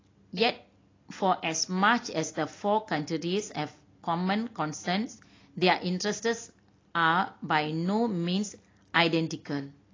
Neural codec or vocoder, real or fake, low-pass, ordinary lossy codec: none; real; 7.2 kHz; AAC, 32 kbps